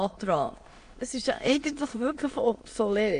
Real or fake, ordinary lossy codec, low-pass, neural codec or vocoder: fake; AAC, 48 kbps; 9.9 kHz; autoencoder, 22.05 kHz, a latent of 192 numbers a frame, VITS, trained on many speakers